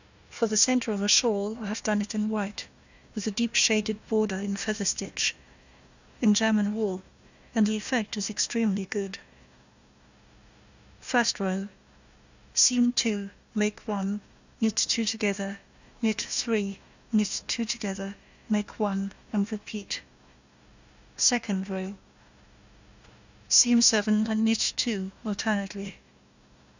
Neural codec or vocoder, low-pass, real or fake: codec, 16 kHz, 1 kbps, FunCodec, trained on Chinese and English, 50 frames a second; 7.2 kHz; fake